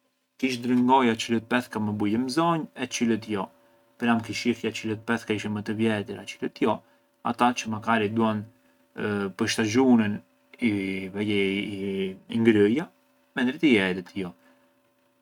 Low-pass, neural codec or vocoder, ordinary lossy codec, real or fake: 19.8 kHz; none; none; real